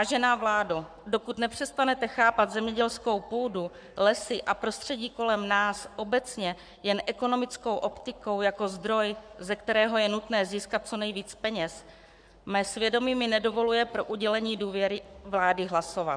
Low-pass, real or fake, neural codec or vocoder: 9.9 kHz; fake; codec, 44.1 kHz, 7.8 kbps, Pupu-Codec